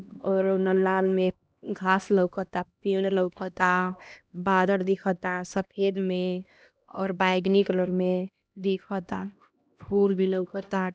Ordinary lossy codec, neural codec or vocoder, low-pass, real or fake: none; codec, 16 kHz, 1 kbps, X-Codec, HuBERT features, trained on LibriSpeech; none; fake